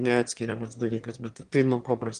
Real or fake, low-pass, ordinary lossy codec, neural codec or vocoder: fake; 9.9 kHz; Opus, 24 kbps; autoencoder, 22.05 kHz, a latent of 192 numbers a frame, VITS, trained on one speaker